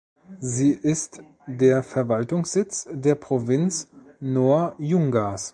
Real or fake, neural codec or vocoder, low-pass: real; none; 10.8 kHz